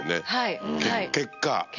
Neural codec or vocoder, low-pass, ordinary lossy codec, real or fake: none; 7.2 kHz; none; real